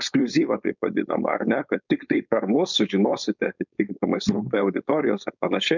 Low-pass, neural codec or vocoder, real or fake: 7.2 kHz; codec, 16 kHz, 4.8 kbps, FACodec; fake